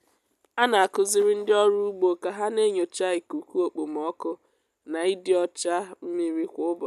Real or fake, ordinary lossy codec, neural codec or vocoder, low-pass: real; none; none; 14.4 kHz